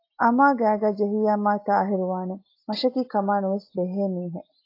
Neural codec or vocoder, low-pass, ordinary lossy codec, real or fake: none; 5.4 kHz; AAC, 32 kbps; real